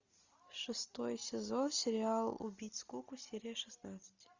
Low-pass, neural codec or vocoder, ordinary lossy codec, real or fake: 7.2 kHz; none; Opus, 64 kbps; real